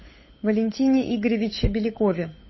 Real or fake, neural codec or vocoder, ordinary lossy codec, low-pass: fake; codec, 16 kHz, 8 kbps, FunCodec, trained on Chinese and English, 25 frames a second; MP3, 24 kbps; 7.2 kHz